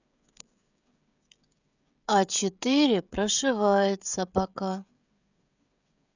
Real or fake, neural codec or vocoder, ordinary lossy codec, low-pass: fake; codec, 16 kHz, 16 kbps, FreqCodec, smaller model; none; 7.2 kHz